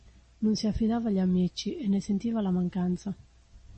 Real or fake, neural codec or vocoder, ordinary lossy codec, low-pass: real; none; MP3, 32 kbps; 10.8 kHz